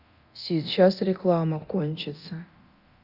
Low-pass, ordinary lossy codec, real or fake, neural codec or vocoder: 5.4 kHz; Opus, 64 kbps; fake; codec, 24 kHz, 0.9 kbps, DualCodec